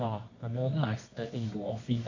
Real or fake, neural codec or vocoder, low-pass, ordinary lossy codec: fake; codec, 24 kHz, 0.9 kbps, WavTokenizer, medium music audio release; 7.2 kHz; AAC, 48 kbps